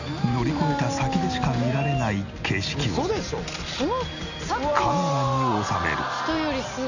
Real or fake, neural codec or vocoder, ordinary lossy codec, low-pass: real; none; none; 7.2 kHz